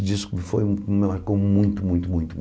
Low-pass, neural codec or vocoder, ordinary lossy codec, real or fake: none; none; none; real